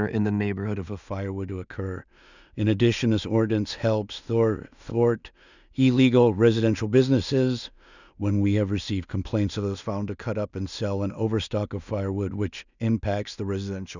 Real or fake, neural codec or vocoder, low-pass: fake; codec, 16 kHz in and 24 kHz out, 0.4 kbps, LongCat-Audio-Codec, two codebook decoder; 7.2 kHz